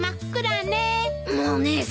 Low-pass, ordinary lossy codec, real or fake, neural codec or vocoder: none; none; real; none